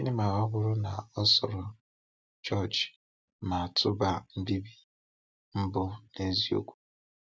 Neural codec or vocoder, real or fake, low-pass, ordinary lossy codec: none; real; none; none